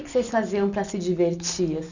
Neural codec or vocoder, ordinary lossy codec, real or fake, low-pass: none; none; real; 7.2 kHz